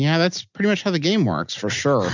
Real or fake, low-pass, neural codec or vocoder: real; 7.2 kHz; none